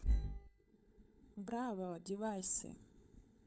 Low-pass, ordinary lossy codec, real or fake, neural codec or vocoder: none; none; fake; codec, 16 kHz, 16 kbps, FunCodec, trained on LibriTTS, 50 frames a second